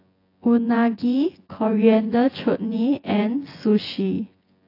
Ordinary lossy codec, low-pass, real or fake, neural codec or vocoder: AAC, 32 kbps; 5.4 kHz; fake; vocoder, 24 kHz, 100 mel bands, Vocos